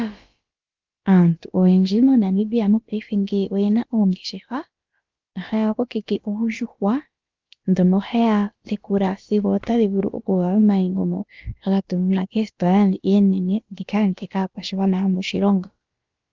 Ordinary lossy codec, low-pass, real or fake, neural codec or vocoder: Opus, 32 kbps; 7.2 kHz; fake; codec, 16 kHz, about 1 kbps, DyCAST, with the encoder's durations